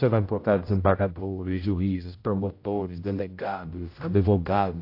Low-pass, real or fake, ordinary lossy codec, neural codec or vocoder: 5.4 kHz; fake; AAC, 32 kbps; codec, 16 kHz, 0.5 kbps, X-Codec, HuBERT features, trained on general audio